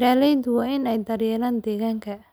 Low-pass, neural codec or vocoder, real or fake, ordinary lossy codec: none; none; real; none